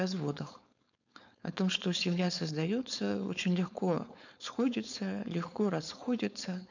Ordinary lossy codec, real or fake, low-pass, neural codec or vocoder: none; fake; 7.2 kHz; codec, 16 kHz, 4.8 kbps, FACodec